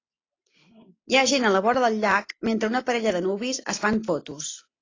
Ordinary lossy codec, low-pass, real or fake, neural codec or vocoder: AAC, 32 kbps; 7.2 kHz; real; none